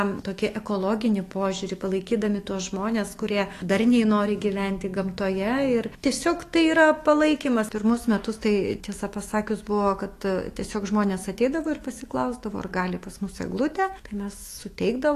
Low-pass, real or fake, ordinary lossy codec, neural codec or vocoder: 14.4 kHz; fake; AAC, 48 kbps; autoencoder, 48 kHz, 128 numbers a frame, DAC-VAE, trained on Japanese speech